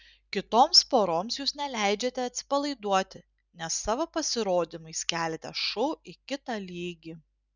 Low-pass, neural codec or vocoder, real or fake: 7.2 kHz; none; real